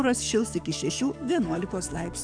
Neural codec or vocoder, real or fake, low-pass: codec, 44.1 kHz, 7.8 kbps, DAC; fake; 9.9 kHz